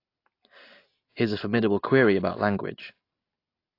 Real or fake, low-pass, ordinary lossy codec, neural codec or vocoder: real; 5.4 kHz; AAC, 32 kbps; none